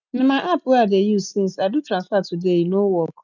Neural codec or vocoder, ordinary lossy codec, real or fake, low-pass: none; none; real; 7.2 kHz